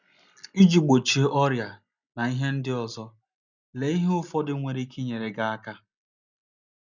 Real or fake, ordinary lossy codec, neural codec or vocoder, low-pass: real; none; none; 7.2 kHz